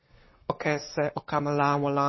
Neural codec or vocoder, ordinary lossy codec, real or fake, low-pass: codec, 44.1 kHz, 7.8 kbps, Pupu-Codec; MP3, 24 kbps; fake; 7.2 kHz